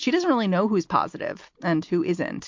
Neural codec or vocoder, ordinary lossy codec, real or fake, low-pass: none; MP3, 48 kbps; real; 7.2 kHz